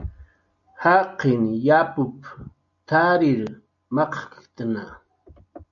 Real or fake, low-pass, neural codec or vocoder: real; 7.2 kHz; none